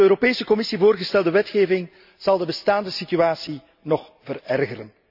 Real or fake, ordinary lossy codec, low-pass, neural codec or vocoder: real; none; 5.4 kHz; none